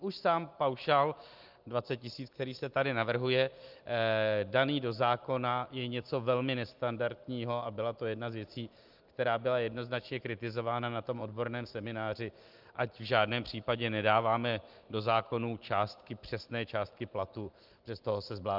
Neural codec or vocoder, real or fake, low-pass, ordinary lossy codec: none; real; 5.4 kHz; Opus, 24 kbps